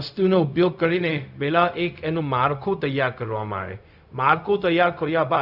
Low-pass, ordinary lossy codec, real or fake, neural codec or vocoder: 5.4 kHz; none; fake; codec, 16 kHz, 0.4 kbps, LongCat-Audio-Codec